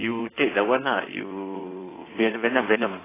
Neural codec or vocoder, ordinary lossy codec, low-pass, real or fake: codec, 16 kHz in and 24 kHz out, 1.1 kbps, FireRedTTS-2 codec; AAC, 16 kbps; 3.6 kHz; fake